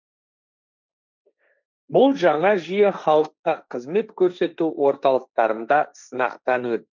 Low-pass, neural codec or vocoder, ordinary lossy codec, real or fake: 7.2 kHz; codec, 16 kHz, 1.1 kbps, Voila-Tokenizer; none; fake